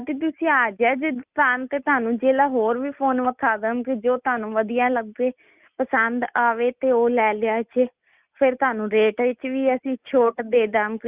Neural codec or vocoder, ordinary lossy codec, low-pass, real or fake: none; Opus, 64 kbps; 3.6 kHz; real